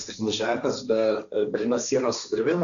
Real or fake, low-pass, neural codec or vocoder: fake; 7.2 kHz; codec, 16 kHz, 1.1 kbps, Voila-Tokenizer